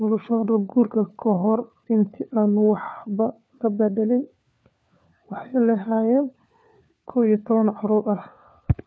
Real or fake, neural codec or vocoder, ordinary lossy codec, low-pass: fake; codec, 16 kHz, 4 kbps, FunCodec, trained on LibriTTS, 50 frames a second; none; none